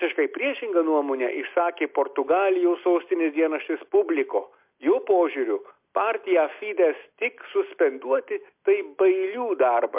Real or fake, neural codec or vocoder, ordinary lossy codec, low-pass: real; none; MP3, 32 kbps; 3.6 kHz